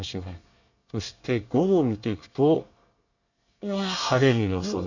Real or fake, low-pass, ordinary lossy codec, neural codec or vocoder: fake; 7.2 kHz; none; codec, 24 kHz, 1 kbps, SNAC